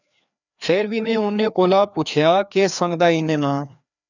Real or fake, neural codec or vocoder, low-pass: fake; codec, 16 kHz, 2 kbps, FreqCodec, larger model; 7.2 kHz